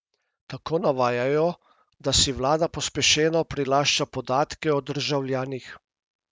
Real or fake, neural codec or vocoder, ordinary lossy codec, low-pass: real; none; none; none